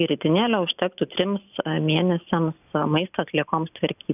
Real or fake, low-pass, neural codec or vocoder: real; 3.6 kHz; none